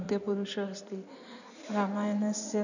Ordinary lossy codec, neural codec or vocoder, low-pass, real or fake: none; codec, 16 kHz in and 24 kHz out, 2.2 kbps, FireRedTTS-2 codec; 7.2 kHz; fake